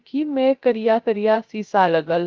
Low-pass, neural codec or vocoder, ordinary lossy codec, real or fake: 7.2 kHz; codec, 16 kHz, 0.3 kbps, FocalCodec; Opus, 32 kbps; fake